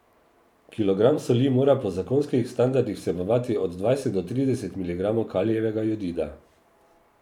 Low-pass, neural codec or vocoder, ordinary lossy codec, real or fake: 19.8 kHz; vocoder, 44.1 kHz, 128 mel bands every 512 samples, BigVGAN v2; none; fake